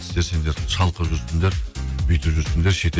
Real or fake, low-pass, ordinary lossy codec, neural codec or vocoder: real; none; none; none